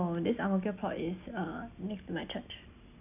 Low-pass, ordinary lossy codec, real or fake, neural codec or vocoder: 3.6 kHz; none; real; none